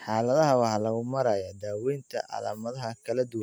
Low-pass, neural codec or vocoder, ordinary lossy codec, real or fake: none; none; none; real